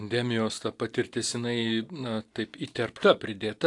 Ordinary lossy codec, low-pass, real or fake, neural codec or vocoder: AAC, 64 kbps; 10.8 kHz; real; none